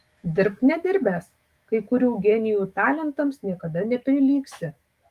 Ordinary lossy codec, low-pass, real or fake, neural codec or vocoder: Opus, 32 kbps; 14.4 kHz; fake; autoencoder, 48 kHz, 128 numbers a frame, DAC-VAE, trained on Japanese speech